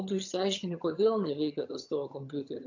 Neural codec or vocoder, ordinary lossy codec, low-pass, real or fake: vocoder, 22.05 kHz, 80 mel bands, HiFi-GAN; AAC, 48 kbps; 7.2 kHz; fake